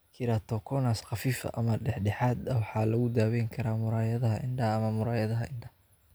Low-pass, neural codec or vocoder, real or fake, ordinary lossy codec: none; none; real; none